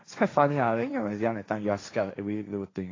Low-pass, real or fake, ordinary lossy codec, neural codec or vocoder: 7.2 kHz; fake; AAC, 32 kbps; codec, 16 kHz, 1.1 kbps, Voila-Tokenizer